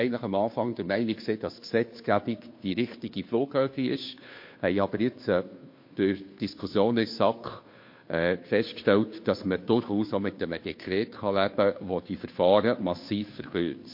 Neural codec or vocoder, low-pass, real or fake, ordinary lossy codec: codec, 16 kHz, 2 kbps, FunCodec, trained on Chinese and English, 25 frames a second; 5.4 kHz; fake; MP3, 32 kbps